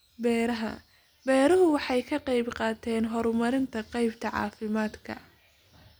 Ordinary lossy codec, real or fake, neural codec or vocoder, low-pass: none; real; none; none